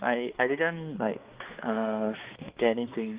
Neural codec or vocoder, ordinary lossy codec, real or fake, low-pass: codec, 16 kHz, 2 kbps, X-Codec, HuBERT features, trained on balanced general audio; Opus, 32 kbps; fake; 3.6 kHz